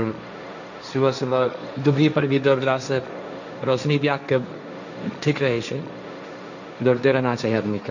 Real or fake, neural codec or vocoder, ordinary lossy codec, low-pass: fake; codec, 16 kHz, 1.1 kbps, Voila-Tokenizer; none; 7.2 kHz